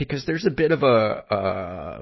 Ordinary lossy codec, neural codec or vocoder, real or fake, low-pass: MP3, 24 kbps; none; real; 7.2 kHz